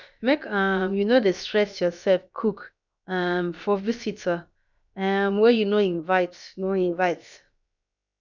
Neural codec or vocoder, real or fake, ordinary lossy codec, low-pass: codec, 16 kHz, about 1 kbps, DyCAST, with the encoder's durations; fake; none; 7.2 kHz